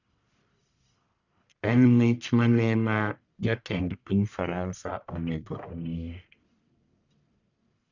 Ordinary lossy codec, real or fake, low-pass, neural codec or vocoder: none; fake; 7.2 kHz; codec, 44.1 kHz, 1.7 kbps, Pupu-Codec